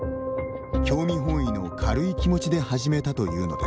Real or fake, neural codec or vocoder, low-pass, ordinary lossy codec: real; none; none; none